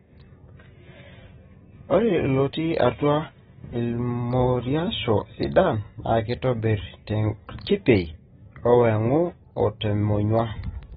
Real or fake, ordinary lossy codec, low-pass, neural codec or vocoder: real; AAC, 16 kbps; 14.4 kHz; none